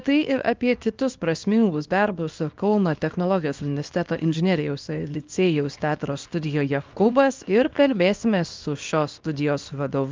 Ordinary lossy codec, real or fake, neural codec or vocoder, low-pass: Opus, 32 kbps; fake; codec, 24 kHz, 0.9 kbps, WavTokenizer, small release; 7.2 kHz